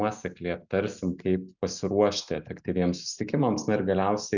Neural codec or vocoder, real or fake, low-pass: none; real; 7.2 kHz